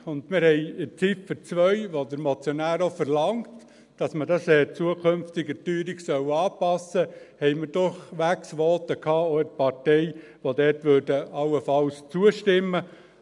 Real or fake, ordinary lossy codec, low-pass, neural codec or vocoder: real; none; 10.8 kHz; none